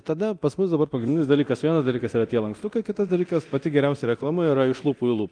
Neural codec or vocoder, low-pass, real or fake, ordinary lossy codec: codec, 24 kHz, 0.9 kbps, DualCodec; 9.9 kHz; fake; Opus, 32 kbps